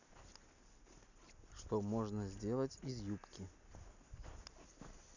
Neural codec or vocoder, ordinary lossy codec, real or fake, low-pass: none; none; real; 7.2 kHz